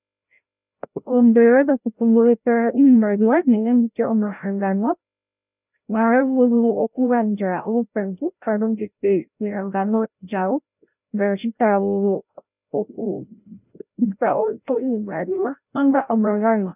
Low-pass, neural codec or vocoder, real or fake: 3.6 kHz; codec, 16 kHz, 0.5 kbps, FreqCodec, larger model; fake